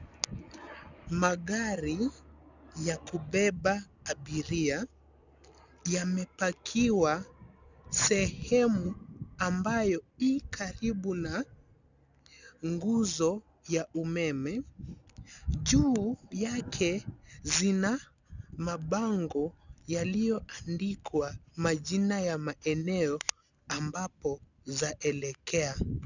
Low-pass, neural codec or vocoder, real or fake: 7.2 kHz; none; real